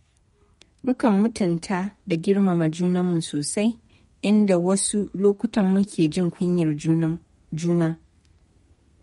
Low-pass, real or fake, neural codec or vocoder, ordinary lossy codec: 14.4 kHz; fake; codec, 32 kHz, 1.9 kbps, SNAC; MP3, 48 kbps